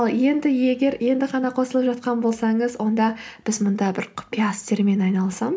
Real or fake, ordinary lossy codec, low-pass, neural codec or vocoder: real; none; none; none